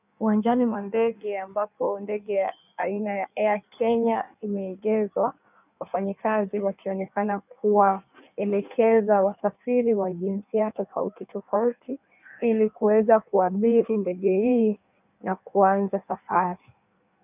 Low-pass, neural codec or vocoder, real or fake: 3.6 kHz; codec, 16 kHz in and 24 kHz out, 1.1 kbps, FireRedTTS-2 codec; fake